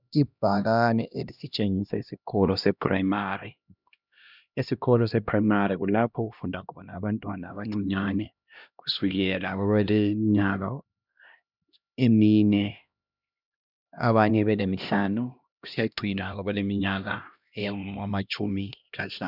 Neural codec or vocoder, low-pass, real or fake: codec, 16 kHz, 1 kbps, X-Codec, HuBERT features, trained on LibriSpeech; 5.4 kHz; fake